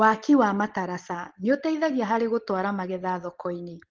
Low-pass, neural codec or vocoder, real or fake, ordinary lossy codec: 7.2 kHz; none; real; Opus, 16 kbps